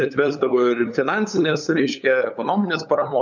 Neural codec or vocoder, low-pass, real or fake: codec, 16 kHz, 8 kbps, FunCodec, trained on LibriTTS, 25 frames a second; 7.2 kHz; fake